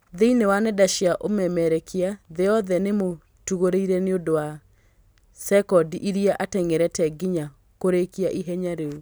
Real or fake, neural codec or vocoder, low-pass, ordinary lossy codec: real; none; none; none